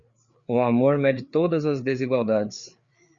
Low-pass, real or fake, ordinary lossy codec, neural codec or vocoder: 7.2 kHz; fake; Opus, 64 kbps; codec, 16 kHz, 4 kbps, FreqCodec, larger model